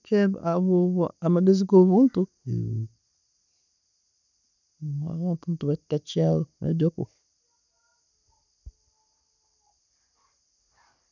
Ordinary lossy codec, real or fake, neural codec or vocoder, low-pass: none; real; none; 7.2 kHz